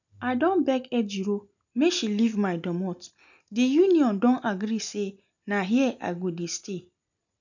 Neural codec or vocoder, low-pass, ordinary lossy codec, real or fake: none; 7.2 kHz; none; real